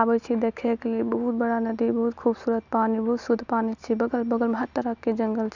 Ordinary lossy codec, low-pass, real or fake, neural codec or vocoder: none; 7.2 kHz; real; none